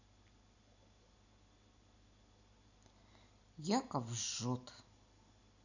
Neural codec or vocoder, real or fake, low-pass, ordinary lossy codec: none; real; 7.2 kHz; none